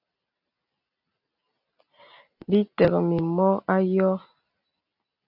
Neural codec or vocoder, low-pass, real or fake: none; 5.4 kHz; real